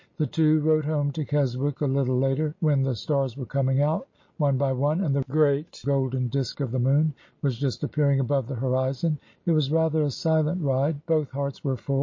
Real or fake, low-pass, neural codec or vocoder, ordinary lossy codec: real; 7.2 kHz; none; MP3, 32 kbps